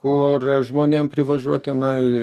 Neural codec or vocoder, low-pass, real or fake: codec, 44.1 kHz, 2.6 kbps, DAC; 14.4 kHz; fake